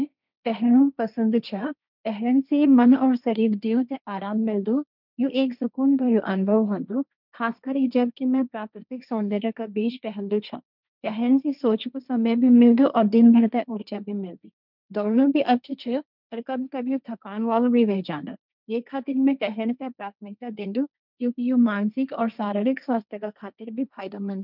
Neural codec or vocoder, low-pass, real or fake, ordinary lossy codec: codec, 16 kHz, 1.1 kbps, Voila-Tokenizer; 5.4 kHz; fake; none